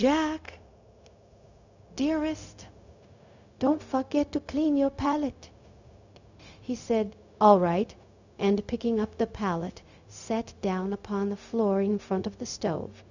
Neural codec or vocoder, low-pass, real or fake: codec, 16 kHz, 0.4 kbps, LongCat-Audio-Codec; 7.2 kHz; fake